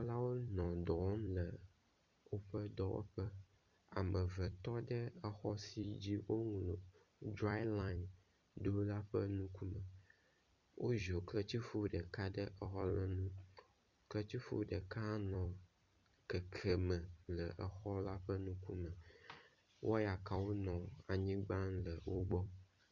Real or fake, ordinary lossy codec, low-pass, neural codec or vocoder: fake; Opus, 64 kbps; 7.2 kHz; vocoder, 44.1 kHz, 128 mel bands every 256 samples, BigVGAN v2